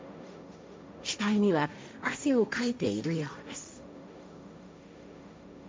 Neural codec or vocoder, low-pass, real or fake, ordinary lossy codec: codec, 16 kHz, 1.1 kbps, Voila-Tokenizer; none; fake; none